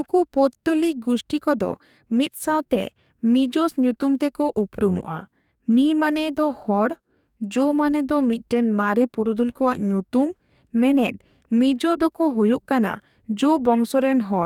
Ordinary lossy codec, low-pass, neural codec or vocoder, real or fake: none; 19.8 kHz; codec, 44.1 kHz, 2.6 kbps, DAC; fake